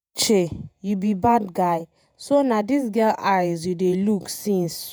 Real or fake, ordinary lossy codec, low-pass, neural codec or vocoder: fake; none; none; vocoder, 48 kHz, 128 mel bands, Vocos